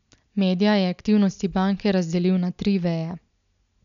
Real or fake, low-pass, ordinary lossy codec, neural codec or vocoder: real; 7.2 kHz; none; none